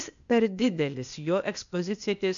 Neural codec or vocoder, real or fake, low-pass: codec, 16 kHz, 0.8 kbps, ZipCodec; fake; 7.2 kHz